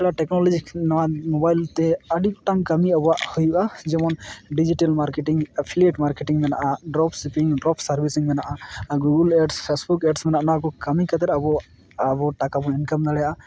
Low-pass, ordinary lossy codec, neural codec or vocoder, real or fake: none; none; none; real